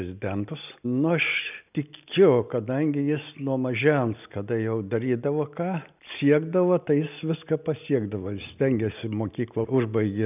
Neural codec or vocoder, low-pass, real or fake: none; 3.6 kHz; real